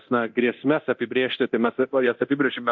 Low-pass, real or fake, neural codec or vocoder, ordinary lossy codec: 7.2 kHz; fake; codec, 24 kHz, 0.9 kbps, DualCodec; MP3, 48 kbps